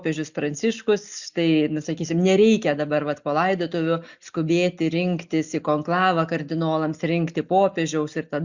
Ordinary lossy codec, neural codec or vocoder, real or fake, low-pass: Opus, 64 kbps; none; real; 7.2 kHz